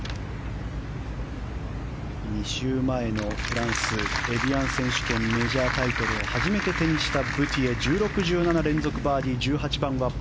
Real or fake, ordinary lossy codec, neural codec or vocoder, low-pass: real; none; none; none